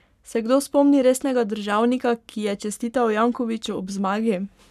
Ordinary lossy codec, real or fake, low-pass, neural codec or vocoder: none; fake; 14.4 kHz; codec, 44.1 kHz, 7.8 kbps, Pupu-Codec